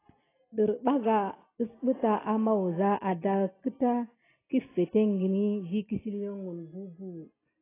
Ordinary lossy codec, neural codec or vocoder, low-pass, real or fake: AAC, 16 kbps; none; 3.6 kHz; real